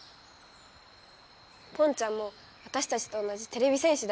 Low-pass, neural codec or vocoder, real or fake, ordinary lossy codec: none; none; real; none